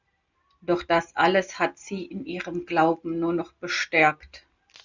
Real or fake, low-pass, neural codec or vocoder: real; 7.2 kHz; none